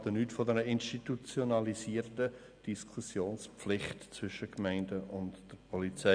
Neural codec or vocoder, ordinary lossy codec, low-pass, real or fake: none; none; 9.9 kHz; real